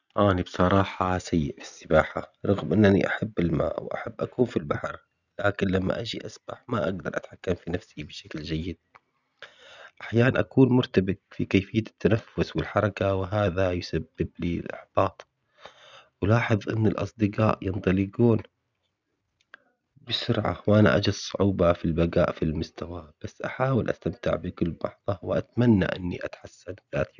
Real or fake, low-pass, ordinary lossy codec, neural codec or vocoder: real; 7.2 kHz; none; none